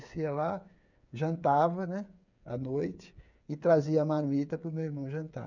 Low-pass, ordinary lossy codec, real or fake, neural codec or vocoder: 7.2 kHz; none; fake; codec, 16 kHz, 8 kbps, FreqCodec, smaller model